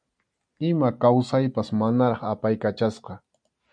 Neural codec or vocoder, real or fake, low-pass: none; real; 9.9 kHz